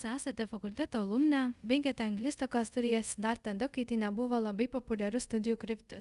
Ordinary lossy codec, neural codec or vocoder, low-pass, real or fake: Opus, 64 kbps; codec, 24 kHz, 0.5 kbps, DualCodec; 10.8 kHz; fake